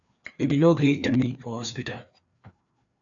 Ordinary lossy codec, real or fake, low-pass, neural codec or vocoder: AAC, 64 kbps; fake; 7.2 kHz; codec, 16 kHz, 2 kbps, FreqCodec, larger model